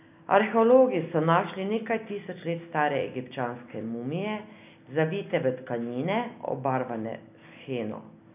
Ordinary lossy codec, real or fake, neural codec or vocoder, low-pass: none; real; none; 3.6 kHz